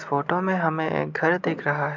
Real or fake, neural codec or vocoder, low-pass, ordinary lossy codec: real; none; 7.2 kHz; MP3, 64 kbps